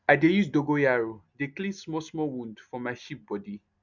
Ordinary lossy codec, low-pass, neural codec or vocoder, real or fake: none; 7.2 kHz; none; real